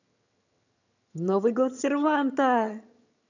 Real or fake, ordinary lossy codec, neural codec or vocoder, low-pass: fake; none; vocoder, 22.05 kHz, 80 mel bands, HiFi-GAN; 7.2 kHz